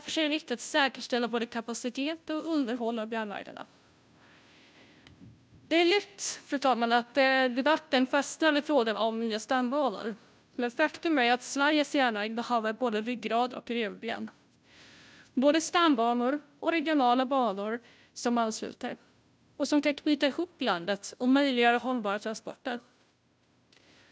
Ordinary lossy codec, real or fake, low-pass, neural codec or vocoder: none; fake; none; codec, 16 kHz, 0.5 kbps, FunCodec, trained on Chinese and English, 25 frames a second